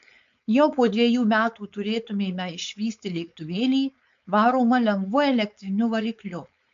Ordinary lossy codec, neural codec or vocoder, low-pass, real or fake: MP3, 64 kbps; codec, 16 kHz, 4.8 kbps, FACodec; 7.2 kHz; fake